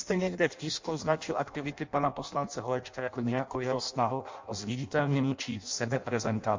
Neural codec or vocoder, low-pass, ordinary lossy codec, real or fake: codec, 16 kHz in and 24 kHz out, 0.6 kbps, FireRedTTS-2 codec; 7.2 kHz; MP3, 48 kbps; fake